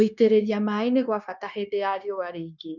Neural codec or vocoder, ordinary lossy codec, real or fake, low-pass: codec, 16 kHz, 0.9 kbps, LongCat-Audio-Codec; none; fake; 7.2 kHz